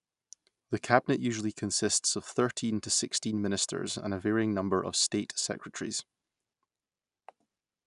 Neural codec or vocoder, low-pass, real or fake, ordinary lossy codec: none; 10.8 kHz; real; none